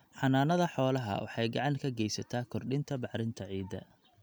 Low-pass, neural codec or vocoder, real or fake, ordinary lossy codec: none; none; real; none